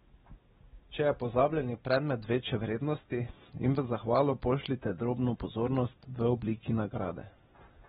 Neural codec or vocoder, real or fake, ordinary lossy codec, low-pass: none; real; AAC, 16 kbps; 19.8 kHz